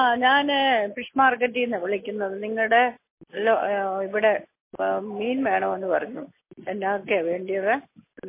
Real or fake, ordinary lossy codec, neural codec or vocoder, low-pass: real; MP3, 24 kbps; none; 3.6 kHz